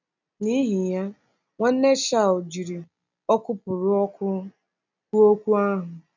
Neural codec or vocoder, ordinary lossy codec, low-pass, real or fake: none; none; 7.2 kHz; real